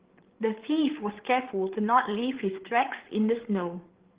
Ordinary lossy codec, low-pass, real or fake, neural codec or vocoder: Opus, 16 kbps; 3.6 kHz; fake; codec, 16 kHz, 8 kbps, FreqCodec, larger model